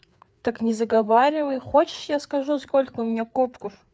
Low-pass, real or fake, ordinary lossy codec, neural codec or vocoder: none; fake; none; codec, 16 kHz, 4 kbps, FreqCodec, larger model